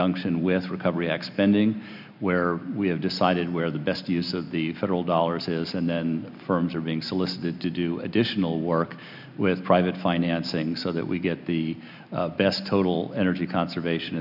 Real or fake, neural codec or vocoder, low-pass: real; none; 5.4 kHz